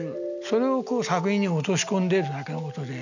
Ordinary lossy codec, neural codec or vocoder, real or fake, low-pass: none; none; real; 7.2 kHz